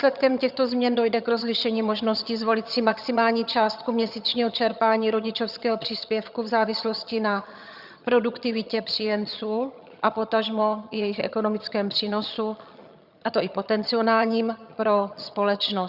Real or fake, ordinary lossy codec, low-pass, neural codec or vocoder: fake; Opus, 64 kbps; 5.4 kHz; vocoder, 22.05 kHz, 80 mel bands, HiFi-GAN